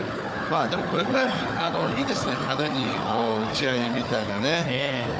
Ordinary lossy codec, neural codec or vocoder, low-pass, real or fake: none; codec, 16 kHz, 4 kbps, FunCodec, trained on Chinese and English, 50 frames a second; none; fake